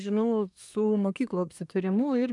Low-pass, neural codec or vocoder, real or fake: 10.8 kHz; codec, 24 kHz, 1 kbps, SNAC; fake